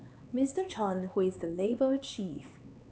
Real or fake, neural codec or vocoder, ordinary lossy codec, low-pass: fake; codec, 16 kHz, 4 kbps, X-Codec, HuBERT features, trained on LibriSpeech; none; none